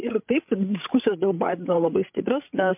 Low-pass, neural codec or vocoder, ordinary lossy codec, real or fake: 3.6 kHz; codec, 16 kHz, 16 kbps, FreqCodec, larger model; MP3, 32 kbps; fake